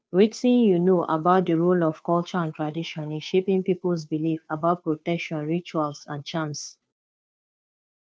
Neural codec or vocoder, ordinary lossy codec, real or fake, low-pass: codec, 16 kHz, 2 kbps, FunCodec, trained on Chinese and English, 25 frames a second; none; fake; none